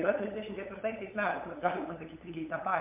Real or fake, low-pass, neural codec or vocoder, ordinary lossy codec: fake; 3.6 kHz; codec, 16 kHz, 8 kbps, FunCodec, trained on LibriTTS, 25 frames a second; MP3, 32 kbps